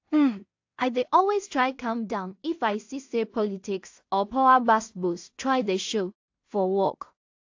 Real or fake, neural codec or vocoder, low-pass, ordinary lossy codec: fake; codec, 16 kHz in and 24 kHz out, 0.4 kbps, LongCat-Audio-Codec, two codebook decoder; 7.2 kHz; AAC, 48 kbps